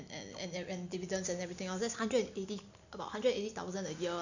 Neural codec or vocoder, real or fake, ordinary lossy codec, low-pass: none; real; none; 7.2 kHz